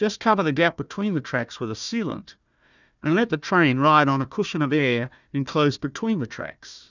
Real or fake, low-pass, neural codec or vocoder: fake; 7.2 kHz; codec, 16 kHz, 1 kbps, FunCodec, trained on Chinese and English, 50 frames a second